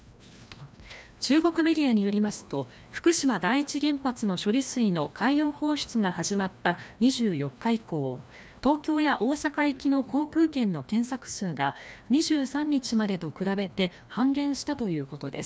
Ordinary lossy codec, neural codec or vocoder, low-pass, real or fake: none; codec, 16 kHz, 1 kbps, FreqCodec, larger model; none; fake